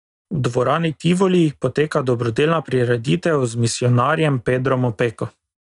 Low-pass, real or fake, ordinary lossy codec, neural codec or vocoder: 10.8 kHz; real; none; none